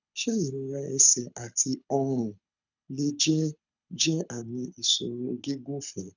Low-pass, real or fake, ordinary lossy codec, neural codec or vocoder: 7.2 kHz; fake; none; codec, 24 kHz, 6 kbps, HILCodec